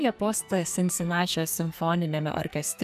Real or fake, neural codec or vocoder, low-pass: fake; codec, 44.1 kHz, 2.6 kbps, SNAC; 14.4 kHz